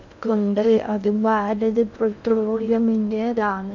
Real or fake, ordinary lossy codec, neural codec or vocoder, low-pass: fake; none; codec, 16 kHz in and 24 kHz out, 0.6 kbps, FocalCodec, streaming, 2048 codes; 7.2 kHz